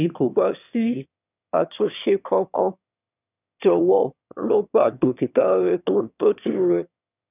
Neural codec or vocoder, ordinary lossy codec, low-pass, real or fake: autoencoder, 22.05 kHz, a latent of 192 numbers a frame, VITS, trained on one speaker; none; 3.6 kHz; fake